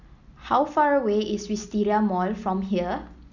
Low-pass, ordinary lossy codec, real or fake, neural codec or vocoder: 7.2 kHz; none; real; none